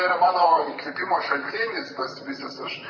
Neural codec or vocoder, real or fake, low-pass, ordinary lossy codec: vocoder, 44.1 kHz, 128 mel bands, Pupu-Vocoder; fake; 7.2 kHz; AAC, 48 kbps